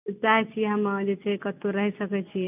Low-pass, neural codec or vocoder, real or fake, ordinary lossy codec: 3.6 kHz; none; real; none